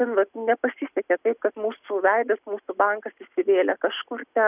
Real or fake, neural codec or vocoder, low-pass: real; none; 3.6 kHz